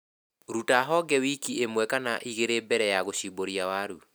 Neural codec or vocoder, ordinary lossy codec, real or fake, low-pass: none; none; real; none